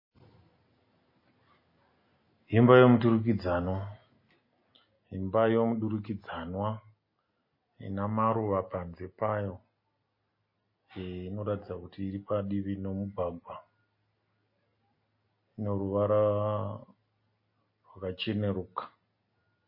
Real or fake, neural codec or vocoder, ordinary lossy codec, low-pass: real; none; MP3, 24 kbps; 5.4 kHz